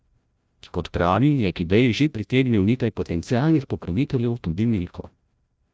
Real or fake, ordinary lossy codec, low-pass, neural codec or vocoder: fake; none; none; codec, 16 kHz, 0.5 kbps, FreqCodec, larger model